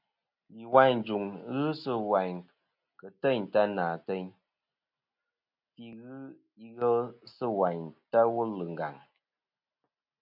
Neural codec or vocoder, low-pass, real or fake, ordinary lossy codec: none; 5.4 kHz; real; MP3, 48 kbps